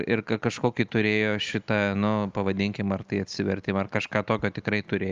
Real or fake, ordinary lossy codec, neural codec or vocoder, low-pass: real; Opus, 32 kbps; none; 7.2 kHz